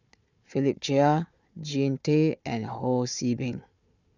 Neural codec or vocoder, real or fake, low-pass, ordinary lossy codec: codec, 16 kHz, 4 kbps, FunCodec, trained on Chinese and English, 50 frames a second; fake; 7.2 kHz; none